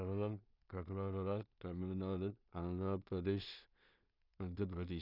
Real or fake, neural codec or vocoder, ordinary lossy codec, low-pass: fake; codec, 16 kHz in and 24 kHz out, 0.4 kbps, LongCat-Audio-Codec, two codebook decoder; none; 5.4 kHz